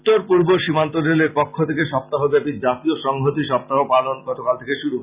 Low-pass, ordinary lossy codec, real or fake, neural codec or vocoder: 3.6 kHz; Opus, 64 kbps; real; none